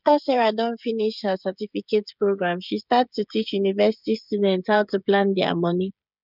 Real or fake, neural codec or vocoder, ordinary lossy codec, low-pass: fake; codec, 16 kHz, 16 kbps, FreqCodec, smaller model; none; 5.4 kHz